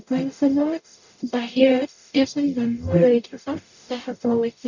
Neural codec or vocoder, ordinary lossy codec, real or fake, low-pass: codec, 44.1 kHz, 0.9 kbps, DAC; none; fake; 7.2 kHz